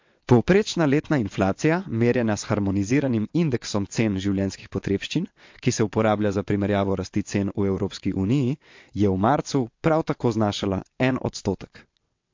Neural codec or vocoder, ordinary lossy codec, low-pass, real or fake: vocoder, 22.05 kHz, 80 mel bands, WaveNeXt; MP3, 48 kbps; 7.2 kHz; fake